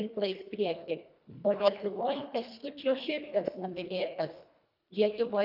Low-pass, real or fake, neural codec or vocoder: 5.4 kHz; fake; codec, 24 kHz, 1.5 kbps, HILCodec